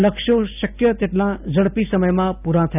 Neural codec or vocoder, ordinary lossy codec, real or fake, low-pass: none; none; real; 3.6 kHz